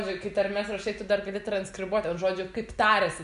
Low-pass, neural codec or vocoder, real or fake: 10.8 kHz; none; real